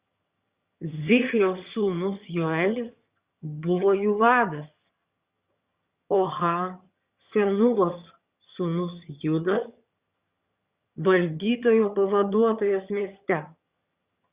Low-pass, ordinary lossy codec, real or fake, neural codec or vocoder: 3.6 kHz; Opus, 64 kbps; fake; vocoder, 22.05 kHz, 80 mel bands, HiFi-GAN